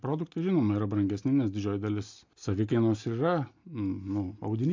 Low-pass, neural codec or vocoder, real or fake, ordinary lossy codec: 7.2 kHz; none; real; MP3, 64 kbps